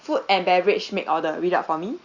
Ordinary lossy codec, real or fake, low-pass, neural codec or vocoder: Opus, 64 kbps; real; 7.2 kHz; none